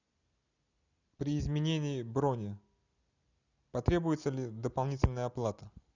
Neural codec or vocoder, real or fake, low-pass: none; real; 7.2 kHz